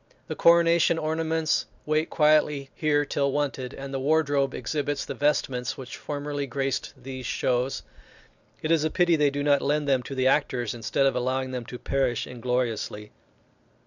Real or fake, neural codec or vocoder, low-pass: real; none; 7.2 kHz